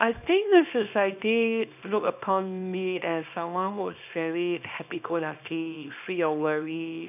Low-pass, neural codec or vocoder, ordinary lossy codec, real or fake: 3.6 kHz; codec, 24 kHz, 0.9 kbps, WavTokenizer, small release; none; fake